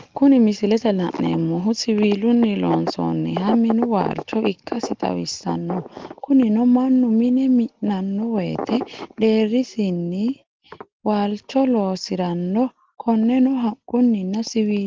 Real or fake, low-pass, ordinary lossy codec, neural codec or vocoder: real; 7.2 kHz; Opus, 16 kbps; none